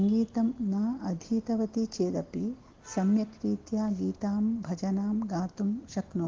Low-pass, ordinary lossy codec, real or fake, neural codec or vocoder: 7.2 kHz; Opus, 16 kbps; real; none